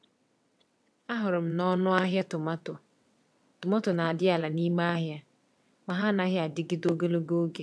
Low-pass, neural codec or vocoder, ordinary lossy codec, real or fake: none; vocoder, 22.05 kHz, 80 mel bands, WaveNeXt; none; fake